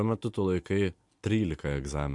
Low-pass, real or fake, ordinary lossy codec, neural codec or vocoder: 10.8 kHz; real; MP3, 64 kbps; none